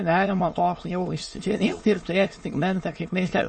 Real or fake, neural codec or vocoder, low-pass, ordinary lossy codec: fake; autoencoder, 22.05 kHz, a latent of 192 numbers a frame, VITS, trained on many speakers; 9.9 kHz; MP3, 32 kbps